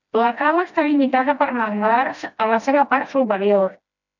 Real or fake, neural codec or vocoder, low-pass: fake; codec, 16 kHz, 1 kbps, FreqCodec, smaller model; 7.2 kHz